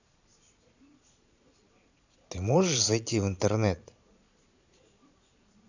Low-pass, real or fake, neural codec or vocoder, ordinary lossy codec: 7.2 kHz; fake; vocoder, 22.05 kHz, 80 mel bands, Vocos; none